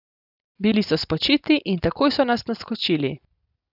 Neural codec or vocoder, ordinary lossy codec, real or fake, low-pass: none; none; real; 5.4 kHz